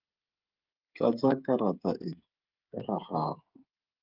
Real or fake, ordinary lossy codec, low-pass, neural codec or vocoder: fake; Opus, 32 kbps; 5.4 kHz; codec, 16 kHz, 8 kbps, FreqCodec, smaller model